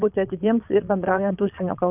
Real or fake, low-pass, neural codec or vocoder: fake; 3.6 kHz; vocoder, 22.05 kHz, 80 mel bands, Vocos